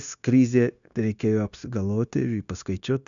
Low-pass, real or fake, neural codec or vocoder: 7.2 kHz; fake; codec, 16 kHz, 0.9 kbps, LongCat-Audio-Codec